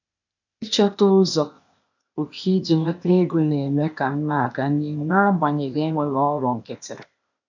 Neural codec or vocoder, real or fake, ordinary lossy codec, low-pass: codec, 16 kHz, 0.8 kbps, ZipCodec; fake; none; 7.2 kHz